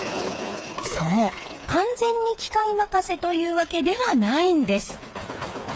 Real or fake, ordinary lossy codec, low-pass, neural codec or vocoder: fake; none; none; codec, 16 kHz, 4 kbps, FreqCodec, smaller model